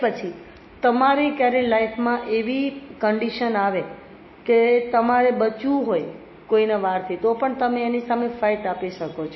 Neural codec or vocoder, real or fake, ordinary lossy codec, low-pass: none; real; MP3, 24 kbps; 7.2 kHz